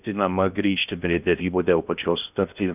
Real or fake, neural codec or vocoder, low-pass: fake; codec, 16 kHz in and 24 kHz out, 0.6 kbps, FocalCodec, streaming, 2048 codes; 3.6 kHz